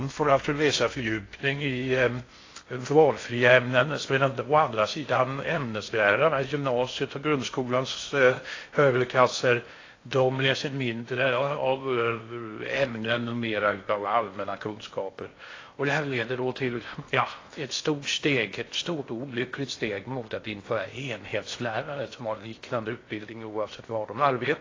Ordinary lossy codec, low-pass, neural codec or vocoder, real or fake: AAC, 32 kbps; 7.2 kHz; codec, 16 kHz in and 24 kHz out, 0.6 kbps, FocalCodec, streaming, 4096 codes; fake